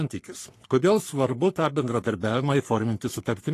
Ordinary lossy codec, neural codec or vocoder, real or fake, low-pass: AAC, 48 kbps; codec, 44.1 kHz, 3.4 kbps, Pupu-Codec; fake; 14.4 kHz